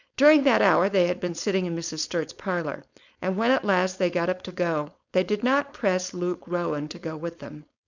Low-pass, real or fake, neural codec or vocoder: 7.2 kHz; fake; codec, 16 kHz, 4.8 kbps, FACodec